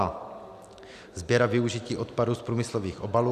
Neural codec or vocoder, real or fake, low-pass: none; real; 14.4 kHz